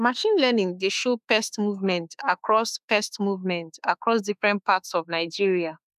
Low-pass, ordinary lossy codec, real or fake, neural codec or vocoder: 14.4 kHz; none; fake; autoencoder, 48 kHz, 32 numbers a frame, DAC-VAE, trained on Japanese speech